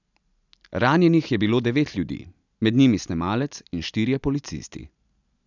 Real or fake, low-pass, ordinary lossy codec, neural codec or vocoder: real; 7.2 kHz; none; none